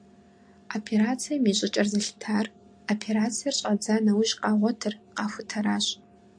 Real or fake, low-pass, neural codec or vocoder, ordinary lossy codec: real; 9.9 kHz; none; AAC, 64 kbps